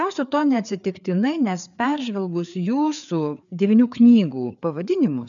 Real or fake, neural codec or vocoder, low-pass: fake; codec, 16 kHz, 4 kbps, FreqCodec, larger model; 7.2 kHz